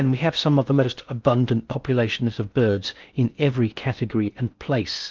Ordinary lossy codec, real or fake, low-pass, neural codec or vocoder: Opus, 24 kbps; fake; 7.2 kHz; codec, 16 kHz in and 24 kHz out, 0.6 kbps, FocalCodec, streaming, 4096 codes